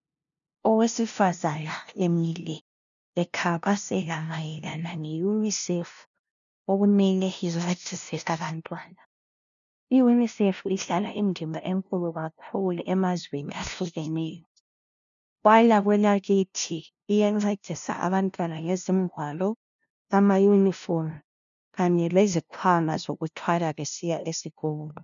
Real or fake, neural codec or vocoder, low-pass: fake; codec, 16 kHz, 0.5 kbps, FunCodec, trained on LibriTTS, 25 frames a second; 7.2 kHz